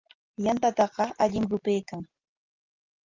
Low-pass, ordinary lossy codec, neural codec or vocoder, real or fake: 7.2 kHz; Opus, 24 kbps; none; real